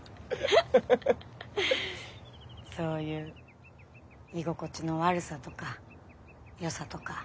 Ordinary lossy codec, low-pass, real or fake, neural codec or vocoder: none; none; real; none